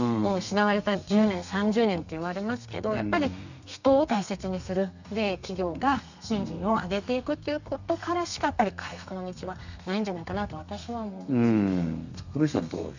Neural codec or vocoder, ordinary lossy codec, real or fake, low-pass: codec, 32 kHz, 1.9 kbps, SNAC; none; fake; 7.2 kHz